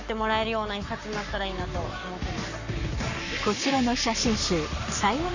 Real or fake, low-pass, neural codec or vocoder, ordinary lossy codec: fake; 7.2 kHz; codec, 44.1 kHz, 7.8 kbps, Pupu-Codec; none